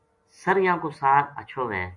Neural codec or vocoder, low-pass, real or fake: none; 10.8 kHz; real